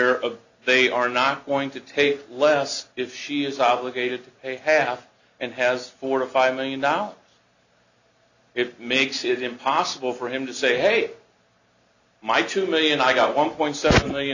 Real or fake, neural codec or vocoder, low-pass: real; none; 7.2 kHz